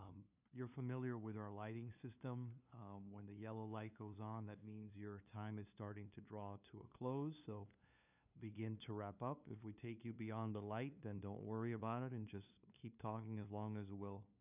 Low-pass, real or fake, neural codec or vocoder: 3.6 kHz; fake; codec, 16 kHz, 2 kbps, FunCodec, trained on LibriTTS, 25 frames a second